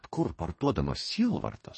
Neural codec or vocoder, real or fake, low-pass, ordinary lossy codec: codec, 44.1 kHz, 2.6 kbps, DAC; fake; 10.8 kHz; MP3, 32 kbps